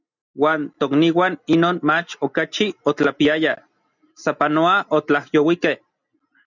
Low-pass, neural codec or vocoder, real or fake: 7.2 kHz; none; real